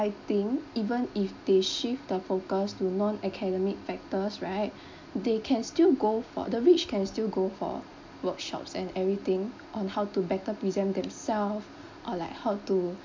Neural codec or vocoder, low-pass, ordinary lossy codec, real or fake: none; 7.2 kHz; MP3, 64 kbps; real